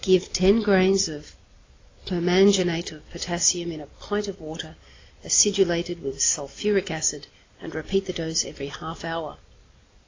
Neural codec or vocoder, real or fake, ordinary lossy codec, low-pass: none; real; AAC, 32 kbps; 7.2 kHz